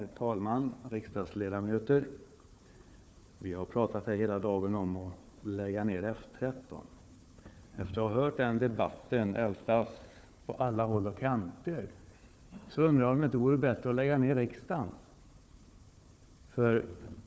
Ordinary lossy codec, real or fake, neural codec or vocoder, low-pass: none; fake; codec, 16 kHz, 4 kbps, FunCodec, trained on Chinese and English, 50 frames a second; none